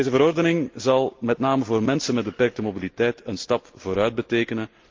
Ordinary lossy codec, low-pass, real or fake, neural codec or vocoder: Opus, 32 kbps; 7.2 kHz; real; none